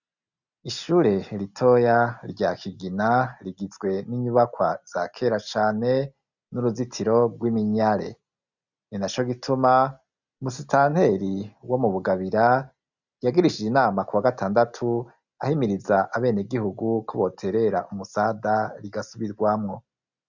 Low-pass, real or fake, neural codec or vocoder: 7.2 kHz; real; none